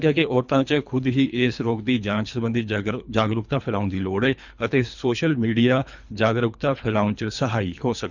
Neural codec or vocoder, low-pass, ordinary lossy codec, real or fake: codec, 24 kHz, 3 kbps, HILCodec; 7.2 kHz; none; fake